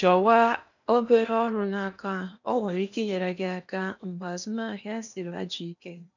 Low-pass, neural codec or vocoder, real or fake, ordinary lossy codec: 7.2 kHz; codec, 16 kHz in and 24 kHz out, 0.8 kbps, FocalCodec, streaming, 65536 codes; fake; none